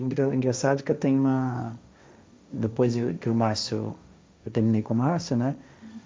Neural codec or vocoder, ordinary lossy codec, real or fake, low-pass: codec, 16 kHz, 1.1 kbps, Voila-Tokenizer; none; fake; none